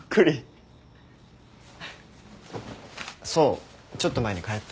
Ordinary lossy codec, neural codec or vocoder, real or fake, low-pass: none; none; real; none